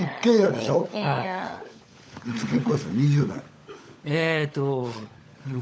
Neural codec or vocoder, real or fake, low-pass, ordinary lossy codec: codec, 16 kHz, 16 kbps, FunCodec, trained on LibriTTS, 50 frames a second; fake; none; none